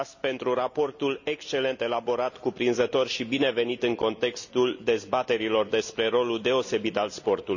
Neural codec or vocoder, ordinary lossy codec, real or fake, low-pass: none; none; real; 7.2 kHz